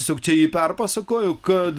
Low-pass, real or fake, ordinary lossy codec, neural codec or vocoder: 14.4 kHz; real; Opus, 24 kbps; none